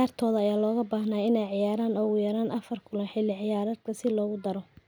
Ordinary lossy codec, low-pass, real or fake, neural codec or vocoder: none; none; real; none